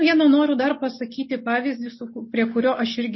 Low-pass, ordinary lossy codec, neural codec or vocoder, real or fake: 7.2 kHz; MP3, 24 kbps; none; real